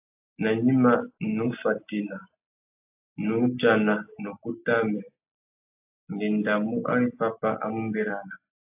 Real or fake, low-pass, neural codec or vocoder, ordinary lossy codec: real; 3.6 kHz; none; AAC, 32 kbps